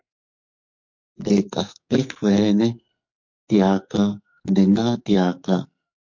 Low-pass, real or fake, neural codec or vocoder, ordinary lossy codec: 7.2 kHz; fake; codec, 44.1 kHz, 2.6 kbps, SNAC; MP3, 48 kbps